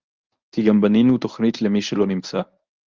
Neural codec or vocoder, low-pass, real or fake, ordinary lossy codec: codec, 24 kHz, 0.9 kbps, WavTokenizer, medium speech release version 1; 7.2 kHz; fake; Opus, 32 kbps